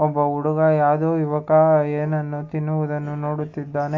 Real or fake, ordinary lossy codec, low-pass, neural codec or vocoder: real; AAC, 48 kbps; 7.2 kHz; none